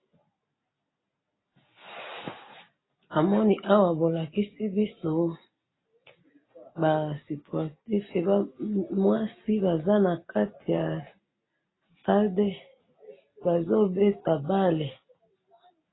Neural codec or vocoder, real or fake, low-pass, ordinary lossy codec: none; real; 7.2 kHz; AAC, 16 kbps